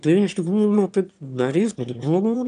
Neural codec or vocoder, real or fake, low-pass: autoencoder, 22.05 kHz, a latent of 192 numbers a frame, VITS, trained on one speaker; fake; 9.9 kHz